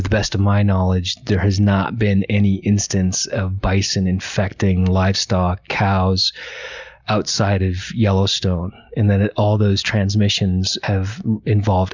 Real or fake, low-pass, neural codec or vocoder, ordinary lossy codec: real; 7.2 kHz; none; Opus, 64 kbps